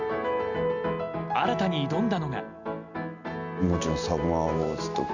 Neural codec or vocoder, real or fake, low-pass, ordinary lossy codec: none; real; 7.2 kHz; Opus, 64 kbps